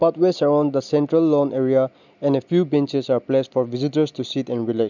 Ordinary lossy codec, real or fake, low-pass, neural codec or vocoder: none; real; 7.2 kHz; none